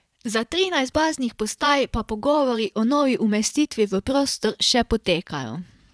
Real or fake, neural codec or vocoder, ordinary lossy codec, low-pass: fake; vocoder, 22.05 kHz, 80 mel bands, Vocos; none; none